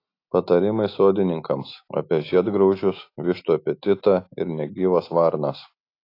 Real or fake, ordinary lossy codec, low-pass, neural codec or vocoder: real; AAC, 32 kbps; 5.4 kHz; none